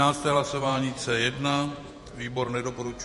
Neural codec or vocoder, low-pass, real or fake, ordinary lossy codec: vocoder, 48 kHz, 128 mel bands, Vocos; 14.4 kHz; fake; MP3, 48 kbps